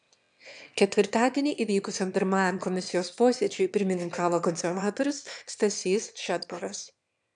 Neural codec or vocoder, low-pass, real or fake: autoencoder, 22.05 kHz, a latent of 192 numbers a frame, VITS, trained on one speaker; 9.9 kHz; fake